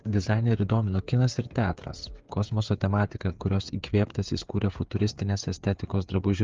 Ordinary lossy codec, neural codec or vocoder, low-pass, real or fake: Opus, 32 kbps; codec, 16 kHz, 8 kbps, FreqCodec, smaller model; 7.2 kHz; fake